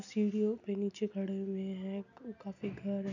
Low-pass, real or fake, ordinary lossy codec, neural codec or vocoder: 7.2 kHz; real; none; none